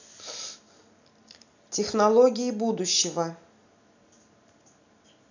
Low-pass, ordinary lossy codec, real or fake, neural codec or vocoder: 7.2 kHz; none; real; none